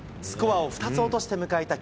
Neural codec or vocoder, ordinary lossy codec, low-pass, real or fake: none; none; none; real